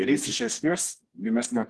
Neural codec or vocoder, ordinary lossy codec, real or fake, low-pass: codec, 24 kHz, 0.9 kbps, WavTokenizer, medium music audio release; Opus, 16 kbps; fake; 10.8 kHz